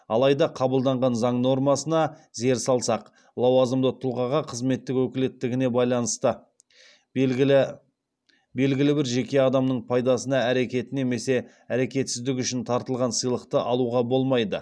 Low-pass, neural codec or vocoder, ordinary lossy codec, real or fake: none; none; none; real